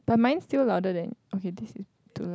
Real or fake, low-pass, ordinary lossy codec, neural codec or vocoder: real; none; none; none